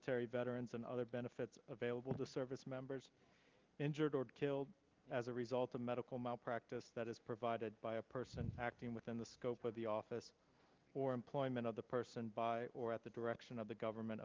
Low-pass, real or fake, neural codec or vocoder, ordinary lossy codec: 7.2 kHz; real; none; Opus, 32 kbps